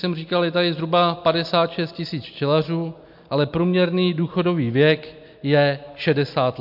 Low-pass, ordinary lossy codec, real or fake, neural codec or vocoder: 5.4 kHz; MP3, 48 kbps; real; none